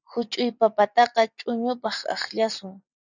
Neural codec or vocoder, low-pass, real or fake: none; 7.2 kHz; real